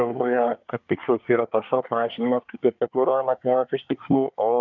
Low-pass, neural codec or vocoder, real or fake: 7.2 kHz; codec, 24 kHz, 1 kbps, SNAC; fake